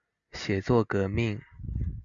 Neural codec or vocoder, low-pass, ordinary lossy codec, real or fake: none; 7.2 kHz; Opus, 64 kbps; real